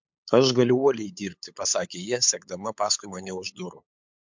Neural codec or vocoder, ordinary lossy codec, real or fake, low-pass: codec, 16 kHz, 8 kbps, FunCodec, trained on LibriTTS, 25 frames a second; MP3, 64 kbps; fake; 7.2 kHz